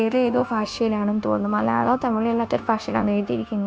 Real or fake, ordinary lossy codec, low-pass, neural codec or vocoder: fake; none; none; codec, 16 kHz, 0.9 kbps, LongCat-Audio-Codec